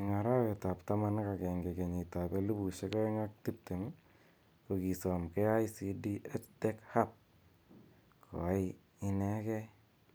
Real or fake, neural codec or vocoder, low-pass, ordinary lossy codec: real; none; none; none